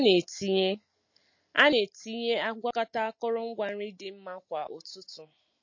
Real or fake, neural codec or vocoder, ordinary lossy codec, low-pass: real; none; MP3, 32 kbps; 7.2 kHz